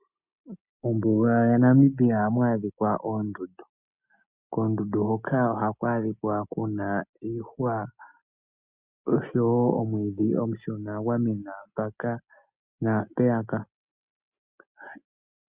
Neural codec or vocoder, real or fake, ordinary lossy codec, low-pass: none; real; Opus, 64 kbps; 3.6 kHz